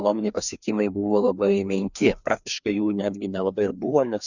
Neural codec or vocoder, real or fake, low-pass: codec, 16 kHz, 2 kbps, FreqCodec, larger model; fake; 7.2 kHz